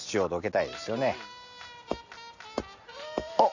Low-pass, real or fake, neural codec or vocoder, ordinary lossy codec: 7.2 kHz; real; none; none